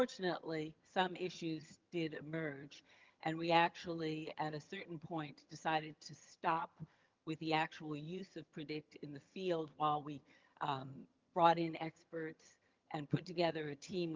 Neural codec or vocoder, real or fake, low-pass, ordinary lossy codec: vocoder, 22.05 kHz, 80 mel bands, HiFi-GAN; fake; 7.2 kHz; Opus, 24 kbps